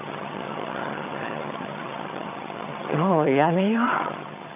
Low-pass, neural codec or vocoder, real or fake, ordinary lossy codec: 3.6 kHz; vocoder, 22.05 kHz, 80 mel bands, HiFi-GAN; fake; none